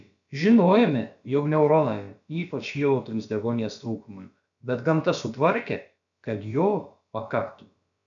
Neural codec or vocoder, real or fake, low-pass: codec, 16 kHz, about 1 kbps, DyCAST, with the encoder's durations; fake; 7.2 kHz